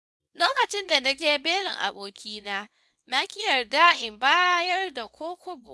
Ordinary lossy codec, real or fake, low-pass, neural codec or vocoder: none; fake; none; codec, 24 kHz, 0.9 kbps, WavTokenizer, small release